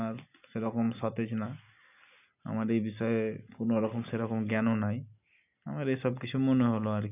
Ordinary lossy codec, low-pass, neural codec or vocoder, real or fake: none; 3.6 kHz; none; real